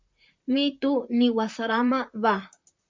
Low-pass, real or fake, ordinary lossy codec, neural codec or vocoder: 7.2 kHz; fake; MP3, 64 kbps; vocoder, 44.1 kHz, 128 mel bands, Pupu-Vocoder